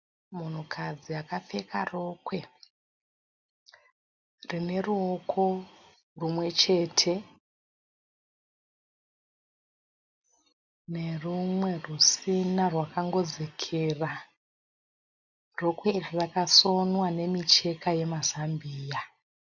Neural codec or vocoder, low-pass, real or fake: none; 7.2 kHz; real